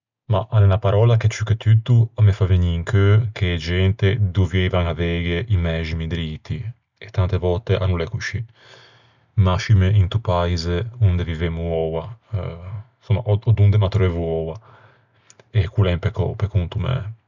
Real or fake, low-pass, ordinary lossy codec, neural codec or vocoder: real; 7.2 kHz; none; none